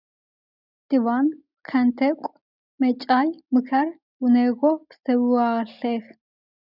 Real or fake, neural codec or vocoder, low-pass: real; none; 5.4 kHz